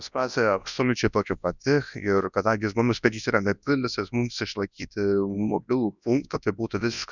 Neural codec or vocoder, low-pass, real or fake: codec, 24 kHz, 0.9 kbps, WavTokenizer, large speech release; 7.2 kHz; fake